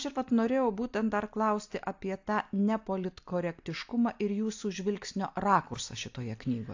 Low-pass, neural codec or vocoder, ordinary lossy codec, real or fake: 7.2 kHz; none; AAC, 48 kbps; real